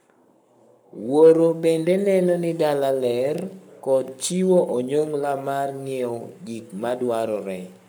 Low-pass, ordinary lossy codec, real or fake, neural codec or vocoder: none; none; fake; codec, 44.1 kHz, 7.8 kbps, Pupu-Codec